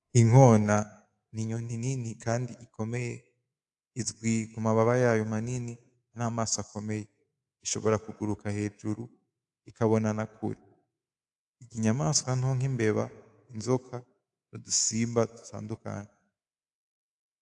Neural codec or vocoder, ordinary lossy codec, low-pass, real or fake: codec, 24 kHz, 3.1 kbps, DualCodec; AAC, 64 kbps; 10.8 kHz; fake